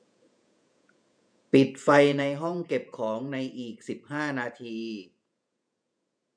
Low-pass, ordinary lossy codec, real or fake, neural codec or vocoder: 9.9 kHz; none; fake; vocoder, 48 kHz, 128 mel bands, Vocos